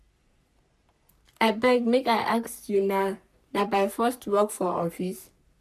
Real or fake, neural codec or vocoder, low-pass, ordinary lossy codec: fake; codec, 44.1 kHz, 3.4 kbps, Pupu-Codec; 14.4 kHz; none